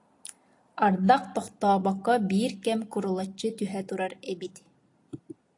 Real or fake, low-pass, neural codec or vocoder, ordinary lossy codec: real; 10.8 kHz; none; AAC, 48 kbps